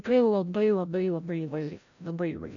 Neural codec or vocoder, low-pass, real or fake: codec, 16 kHz, 0.5 kbps, FreqCodec, larger model; 7.2 kHz; fake